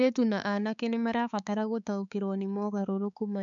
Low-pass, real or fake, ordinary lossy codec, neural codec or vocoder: 7.2 kHz; fake; MP3, 96 kbps; codec, 16 kHz, 4 kbps, X-Codec, HuBERT features, trained on balanced general audio